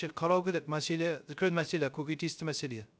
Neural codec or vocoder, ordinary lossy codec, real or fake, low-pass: codec, 16 kHz, 0.3 kbps, FocalCodec; none; fake; none